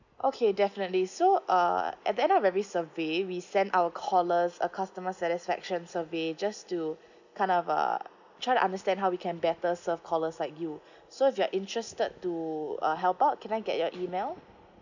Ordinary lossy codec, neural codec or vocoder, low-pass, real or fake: none; none; 7.2 kHz; real